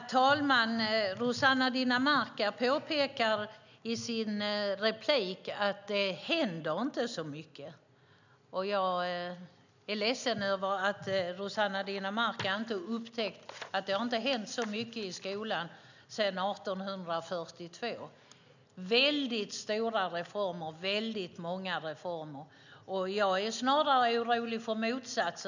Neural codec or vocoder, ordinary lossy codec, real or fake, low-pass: none; none; real; 7.2 kHz